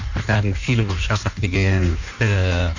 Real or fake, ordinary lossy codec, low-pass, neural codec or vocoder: fake; none; 7.2 kHz; codec, 16 kHz in and 24 kHz out, 1.1 kbps, FireRedTTS-2 codec